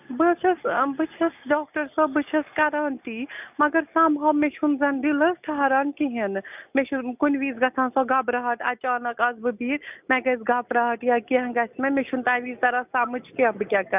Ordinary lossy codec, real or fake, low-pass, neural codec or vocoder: none; real; 3.6 kHz; none